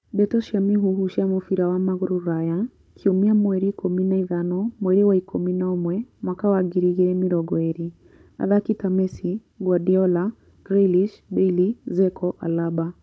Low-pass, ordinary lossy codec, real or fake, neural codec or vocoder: none; none; fake; codec, 16 kHz, 16 kbps, FunCodec, trained on Chinese and English, 50 frames a second